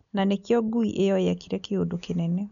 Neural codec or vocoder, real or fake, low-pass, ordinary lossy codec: none; real; 7.2 kHz; none